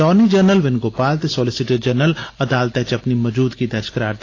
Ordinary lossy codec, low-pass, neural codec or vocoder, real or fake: AAC, 32 kbps; 7.2 kHz; none; real